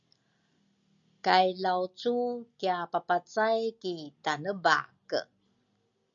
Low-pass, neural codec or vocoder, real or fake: 7.2 kHz; none; real